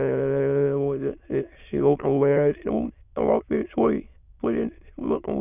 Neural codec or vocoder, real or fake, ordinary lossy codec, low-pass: autoencoder, 22.05 kHz, a latent of 192 numbers a frame, VITS, trained on many speakers; fake; none; 3.6 kHz